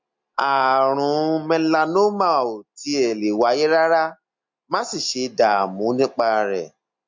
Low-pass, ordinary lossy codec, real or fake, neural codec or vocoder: 7.2 kHz; MP3, 48 kbps; real; none